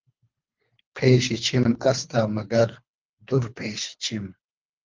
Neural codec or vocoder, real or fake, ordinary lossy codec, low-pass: codec, 24 kHz, 3 kbps, HILCodec; fake; Opus, 24 kbps; 7.2 kHz